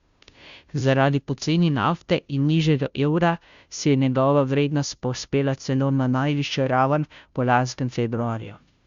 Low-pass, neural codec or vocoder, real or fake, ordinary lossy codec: 7.2 kHz; codec, 16 kHz, 0.5 kbps, FunCodec, trained on Chinese and English, 25 frames a second; fake; Opus, 64 kbps